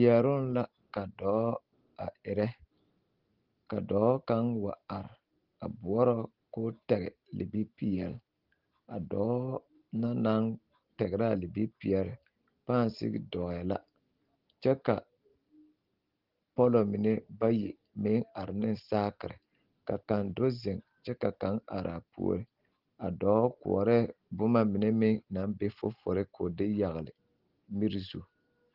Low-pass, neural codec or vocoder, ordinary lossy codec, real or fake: 5.4 kHz; none; Opus, 16 kbps; real